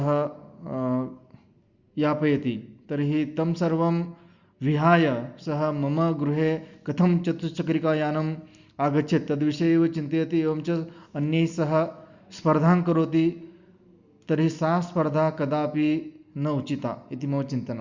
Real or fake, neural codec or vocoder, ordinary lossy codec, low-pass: real; none; Opus, 64 kbps; 7.2 kHz